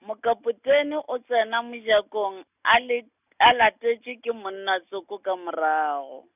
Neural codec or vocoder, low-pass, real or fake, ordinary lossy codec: none; 3.6 kHz; real; none